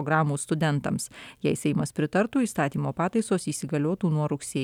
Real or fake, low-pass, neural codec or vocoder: real; 19.8 kHz; none